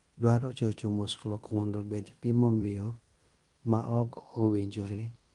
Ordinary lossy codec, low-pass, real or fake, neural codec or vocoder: Opus, 32 kbps; 10.8 kHz; fake; codec, 16 kHz in and 24 kHz out, 0.9 kbps, LongCat-Audio-Codec, fine tuned four codebook decoder